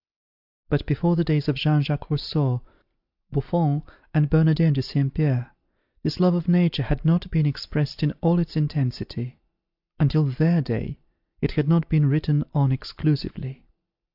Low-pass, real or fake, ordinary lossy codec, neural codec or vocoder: 5.4 kHz; real; AAC, 48 kbps; none